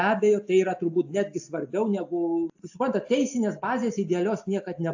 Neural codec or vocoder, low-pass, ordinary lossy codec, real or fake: none; 7.2 kHz; AAC, 48 kbps; real